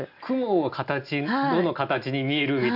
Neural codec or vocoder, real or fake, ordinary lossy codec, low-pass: none; real; none; 5.4 kHz